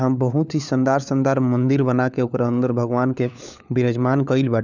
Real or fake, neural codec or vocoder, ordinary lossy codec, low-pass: fake; codec, 16 kHz, 4 kbps, FunCodec, trained on LibriTTS, 50 frames a second; none; 7.2 kHz